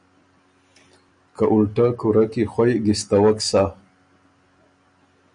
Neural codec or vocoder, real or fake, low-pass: none; real; 9.9 kHz